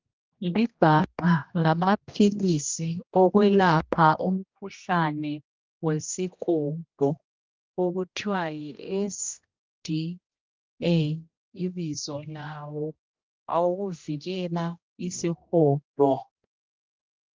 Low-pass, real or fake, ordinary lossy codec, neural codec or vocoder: 7.2 kHz; fake; Opus, 32 kbps; codec, 16 kHz, 1 kbps, X-Codec, HuBERT features, trained on general audio